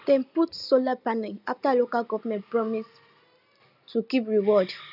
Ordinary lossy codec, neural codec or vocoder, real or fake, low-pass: none; none; real; 5.4 kHz